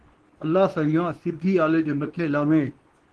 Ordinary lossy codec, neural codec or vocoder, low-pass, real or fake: Opus, 16 kbps; codec, 24 kHz, 0.9 kbps, WavTokenizer, medium speech release version 2; 10.8 kHz; fake